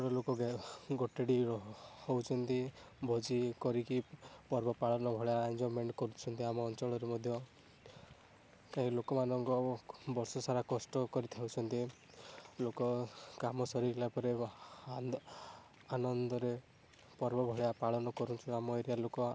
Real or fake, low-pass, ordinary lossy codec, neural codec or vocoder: real; none; none; none